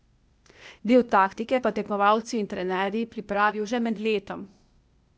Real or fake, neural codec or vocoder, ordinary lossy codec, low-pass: fake; codec, 16 kHz, 0.8 kbps, ZipCodec; none; none